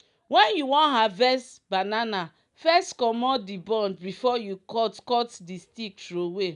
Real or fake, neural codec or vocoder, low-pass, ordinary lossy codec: real; none; 10.8 kHz; none